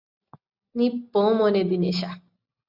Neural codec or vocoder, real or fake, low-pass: none; real; 5.4 kHz